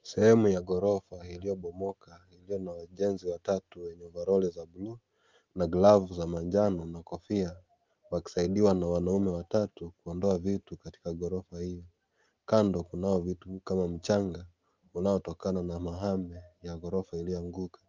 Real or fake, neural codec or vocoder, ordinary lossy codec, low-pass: real; none; Opus, 32 kbps; 7.2 kHz